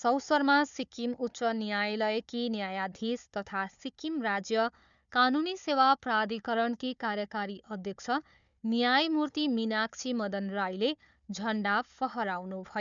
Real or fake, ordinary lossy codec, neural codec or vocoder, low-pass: fake; MP3, 96 kbps; codec, 16 kHz, 4 kbps, FunCodec, trained on Chinese and English, 50 frames a second; 7.2 kHz